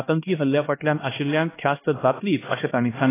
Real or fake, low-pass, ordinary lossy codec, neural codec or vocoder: fake; 3.6 kHz; AAC, 16 kbps; codec, 16 kHz, 1 kbps, X-Codec, HuBERT features, trained on LibriSpeech